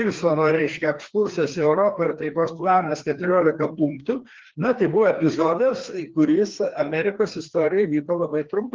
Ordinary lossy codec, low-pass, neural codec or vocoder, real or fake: Opus, 16 kbps; 7.2 kHz; codec, 16 kHz, 2 kbps, FreqCodec, larger model; fake